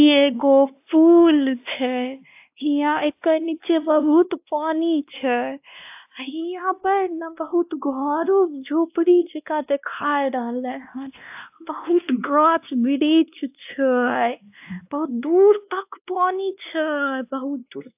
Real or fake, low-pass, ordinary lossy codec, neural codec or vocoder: fake; 3.6 kHz; AAC, 32 kbps; codec, 16 kHz, 1 kbps, X-Codec, WavLM features, trained on Multilingual LibriSpeech